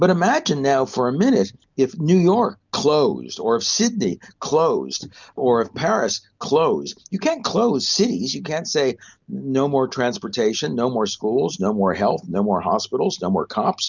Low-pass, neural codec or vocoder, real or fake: 7.2 kHz; none; real